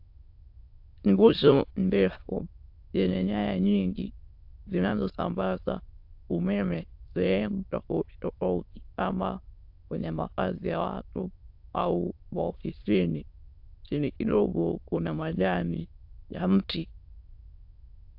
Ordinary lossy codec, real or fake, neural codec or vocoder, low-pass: AAC, 48 kbps; fake; autoencoder, 22.05 kHz, a latent of 192 numbers a frame, VITS, trained on many speakers; 5.4 kHz